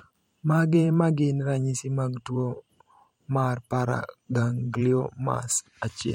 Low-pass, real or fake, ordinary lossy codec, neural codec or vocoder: 19.8 kHz; fake; MP3, 64 kbps; vocoder, 44.1 kHz, 128 mel bands every 512 samples, BigVGAN v2